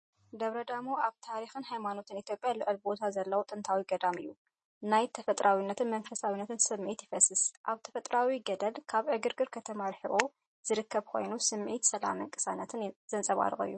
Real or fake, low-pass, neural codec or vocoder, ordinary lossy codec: real; 10.8 kHz; none; MP3, 32 kbps